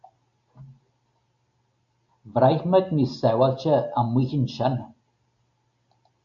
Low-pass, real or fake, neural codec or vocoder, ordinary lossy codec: 7.2 kHz; real; none; AAC, 64 kbps